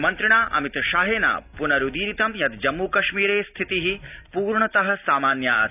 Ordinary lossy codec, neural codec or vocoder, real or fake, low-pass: none; none; real; 3.6 kHz